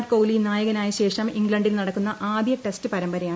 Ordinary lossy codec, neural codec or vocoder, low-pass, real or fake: none; none; none; real